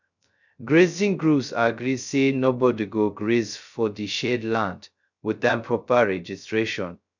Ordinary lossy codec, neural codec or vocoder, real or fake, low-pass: none; codec, 16 kHz, 0.2 kbps, FocalCodec; fake; 7.2 kHz